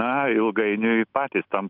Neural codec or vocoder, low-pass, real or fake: none; 5.4 kHz; real